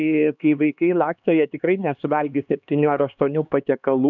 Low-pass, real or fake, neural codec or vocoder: 7.2 kHz; fake; codec, 16 kHz, 2 kbps, X-Codec, HuBERT features, trained on LibriSpeech